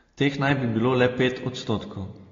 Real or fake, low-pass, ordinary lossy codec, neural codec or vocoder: real; 7.2 kHz; AAC, 32 kbps; none